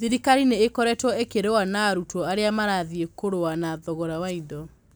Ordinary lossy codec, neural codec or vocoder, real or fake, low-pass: none; none; real; none